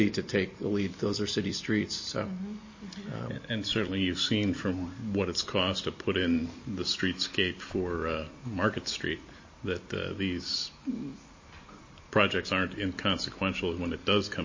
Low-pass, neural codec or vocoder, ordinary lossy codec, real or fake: 7.2 kHz; none; MP3, 32 kbps; real